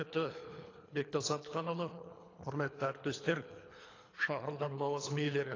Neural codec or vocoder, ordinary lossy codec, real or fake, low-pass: codec, 24 kHz, 3 kbps, HILCodec; AAC, 32 kbps; fake; 7.2 kHz